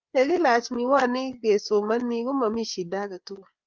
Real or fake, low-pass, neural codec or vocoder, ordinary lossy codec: fake; 7.2 kHz; codec, 16 kHz, 4 kbps, FreqCodec, larger model; Opus, 32 kbps